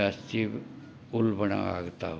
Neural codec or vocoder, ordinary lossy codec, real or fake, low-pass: none; none; real; none